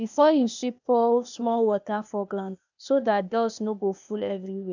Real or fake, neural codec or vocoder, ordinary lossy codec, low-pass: fake; codec, 16 kHz, 0.8 kbps, ZipCodec; none; 7.2 kHz